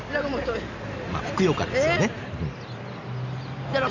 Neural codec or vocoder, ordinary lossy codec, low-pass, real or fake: none; none; 7.2 kHz; real